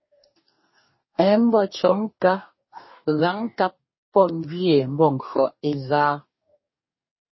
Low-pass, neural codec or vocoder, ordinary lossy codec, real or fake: 7.2 kHz; codec, 44.1 kHz, 2.6 kbps, DAC; MP3, 24 kbps; fake